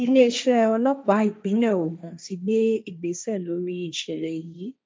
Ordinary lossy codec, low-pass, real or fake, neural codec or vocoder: none; none; fake; codec, 16 kHz, 1.1 kbps, Voila-Tokenizer